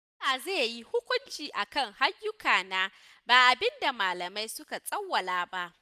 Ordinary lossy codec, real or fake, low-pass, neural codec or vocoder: none; real; 14.4 kHz; none